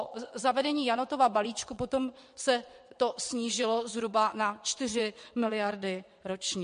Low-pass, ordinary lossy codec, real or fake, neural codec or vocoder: 9.9 kHz; MP3, 48 kbps; fake; vocoder, 22.05 kHz, 80 mel bands, WaveNeXt